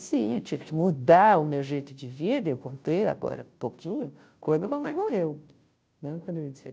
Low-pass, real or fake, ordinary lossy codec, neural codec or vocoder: none; fake; none; codec, 16 kHz, 0.5 kbps, FunCodec, trained on Chinese and English, 25 frames a second